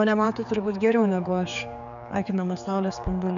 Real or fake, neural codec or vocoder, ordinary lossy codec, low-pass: fake; codec, 16 kHz, 4 kbps, X-Codec, HuBERT features, trained on general audio; MP3, 96 kbps; 7.2 kHz